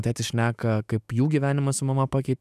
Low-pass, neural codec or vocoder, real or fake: 14.4 kHz; autoencoder, 48 kHz, 128 numbers a frame, DAC-VAE, trained on Japanese speech; fake